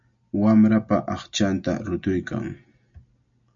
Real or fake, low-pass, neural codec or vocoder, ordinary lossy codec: real; 7.2 kHz; none; MP3, 64 kbps